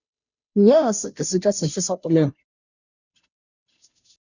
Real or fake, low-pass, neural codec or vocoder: fake; 7.2 kHz; codec, 16 kHz, 0.5 kbps, FunCodec, trained on Chinese and English, 25 frames a second